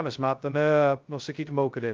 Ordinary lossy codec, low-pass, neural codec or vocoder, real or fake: Opus, 32 kbps; 7.2 kHz; codec, 16 kHz, 0.2 kbps, FocalCodec; fake